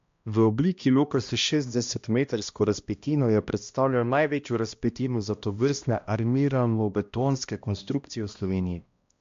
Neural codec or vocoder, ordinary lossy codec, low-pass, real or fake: codec, 16 kHz, 1 kbps, X-Codec, HuBERT features, trained on balanced general audio; MP3, 48 kbps; 7.2 kHz; fake